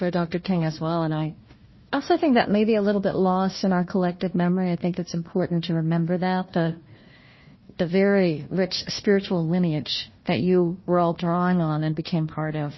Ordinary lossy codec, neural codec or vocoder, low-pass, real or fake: MP3, 24 kbps; codec, 16 kHz, 1 kbps, FunCodec, trained on Chinese and English, 50 frames a second; 7.2 kHz; fake